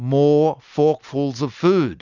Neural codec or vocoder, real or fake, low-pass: none; real; 7.2 kHz